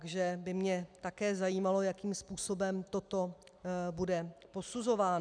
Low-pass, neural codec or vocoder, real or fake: 9.9 kHz; none; real